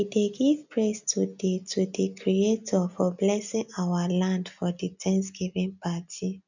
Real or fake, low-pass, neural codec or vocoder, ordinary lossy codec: real; 7.2 kHz; none; none